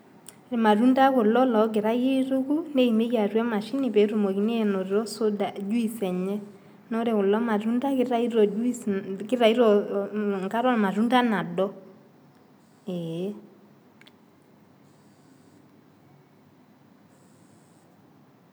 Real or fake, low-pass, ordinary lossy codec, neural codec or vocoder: real; none; none; none